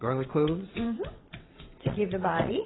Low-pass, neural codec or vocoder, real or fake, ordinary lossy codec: 7.2 kHz; vocoder, 22.05 kHz, 80 mel bands, WaveNeXt; fake; AAC, 16 kbps